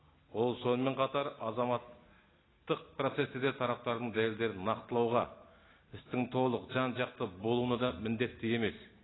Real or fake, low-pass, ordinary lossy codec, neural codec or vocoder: real; 7.2 kHz; AAC, 16 kbps; none